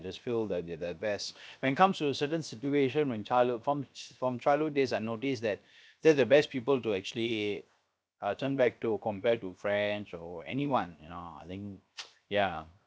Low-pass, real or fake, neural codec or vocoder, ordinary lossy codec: none; fake; codec, 16 kHz, 0.7 kbps, FocalCodec; none